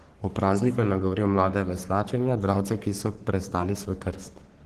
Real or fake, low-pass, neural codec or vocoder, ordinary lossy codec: fake; 14.4 kHz; codec, 44.1 kHz, 3.4 kbps, Pupu-Codec; Opus, 16 kbps